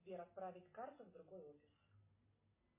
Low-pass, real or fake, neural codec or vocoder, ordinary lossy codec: 3.6 kHz; real; none; MP3, 16 kbps